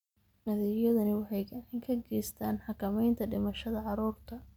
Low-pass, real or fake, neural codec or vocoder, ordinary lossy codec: 19.8 kHz; real; none; none